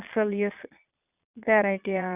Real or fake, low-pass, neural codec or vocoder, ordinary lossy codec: fake; 3.6 kHz; vocoder, 22.05 kHz, 80 mel bands, Vocos; none